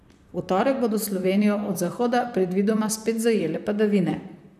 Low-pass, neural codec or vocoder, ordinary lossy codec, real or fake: 14.4 kHz; vocoder, 44.1 kHz, 128 mel bands, Pupu-Vocoder; none; fake